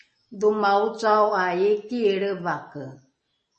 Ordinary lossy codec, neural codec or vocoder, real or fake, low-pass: MP3, 32 kbps; none; real; 9.9 kHz